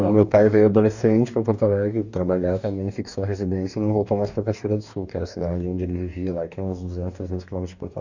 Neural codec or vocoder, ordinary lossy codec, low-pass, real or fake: codec, 44.1 kHz, 2.6 kbps, DAC; none; 7.2 kHz; fake